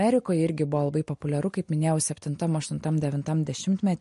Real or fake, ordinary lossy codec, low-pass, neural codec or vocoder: real; MP3, 48 kbps; 14.4 kHz; none